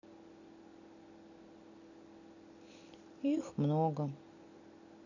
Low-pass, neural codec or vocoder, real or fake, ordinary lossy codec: 7.2 kHz; none; real; none